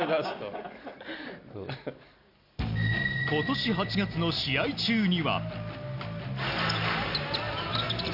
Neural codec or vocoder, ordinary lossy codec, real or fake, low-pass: none; none; real; 5.4 kHz